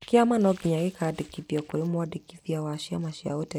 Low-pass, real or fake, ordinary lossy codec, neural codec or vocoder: 19.8 kHz; real; none; none